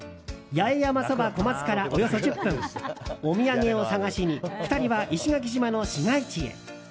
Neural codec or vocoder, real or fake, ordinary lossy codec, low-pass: none; real; none; none